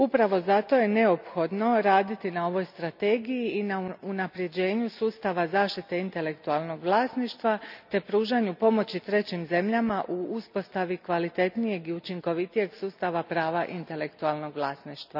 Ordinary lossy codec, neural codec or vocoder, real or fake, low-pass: none; none; real; 5.4 kHz